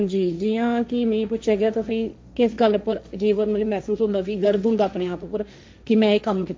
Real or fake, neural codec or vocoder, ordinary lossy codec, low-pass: fake; codec, 16 kHz, 1.1 kbps, Voila-Tokenizer; none; none